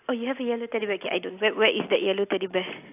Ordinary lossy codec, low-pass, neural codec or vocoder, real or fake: AAC, 32 kbps; 3.6 kHz; none; real